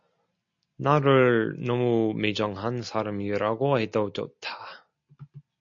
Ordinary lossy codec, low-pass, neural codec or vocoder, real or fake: MP3, 64 kbps; 7.2 kHz; none; real